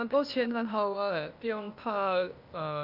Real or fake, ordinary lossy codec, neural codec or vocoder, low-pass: fake; none; codec, 16 kHz, 0.8 kbps, ZipCodec; 5.4 kHz